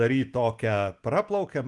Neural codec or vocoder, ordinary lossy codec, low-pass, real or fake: none; Opus, 24 kbps; 10.8 kHz; real